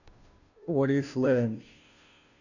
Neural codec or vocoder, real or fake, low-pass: codec, 16 kHz, 0.5 kbps, FunCodec, trained on Chinese and English, 25 frames a second; fake; 7.2 kHz